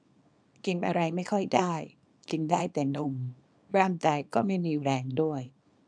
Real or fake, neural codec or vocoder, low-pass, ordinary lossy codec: fake; codec, 24 kHz, 0.9 kbps, WavTokenizer, small release; 9.9 kHz; none